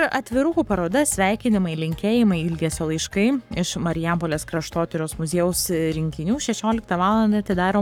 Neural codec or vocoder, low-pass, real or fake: codec, 44.1 kHz, 7.8 kbps, Pupu-Codec; 19.8 kHz; fake